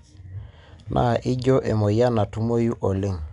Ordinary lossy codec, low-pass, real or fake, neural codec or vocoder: none; 10.8 kHz; real; none